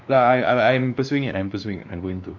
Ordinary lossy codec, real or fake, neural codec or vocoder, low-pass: none; fake; codec, 16 kHz, 2 kbps, X-Codec, WavLM features, trained on Multilingual LibriSpeech; 7.2 kHz